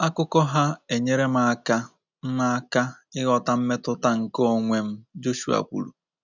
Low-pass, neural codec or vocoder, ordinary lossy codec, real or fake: 7.2 kHz; none; none; real